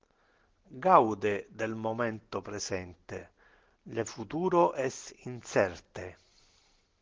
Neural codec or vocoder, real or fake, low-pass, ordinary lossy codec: none; real; 7.2 kHz; Opus, 16 kbps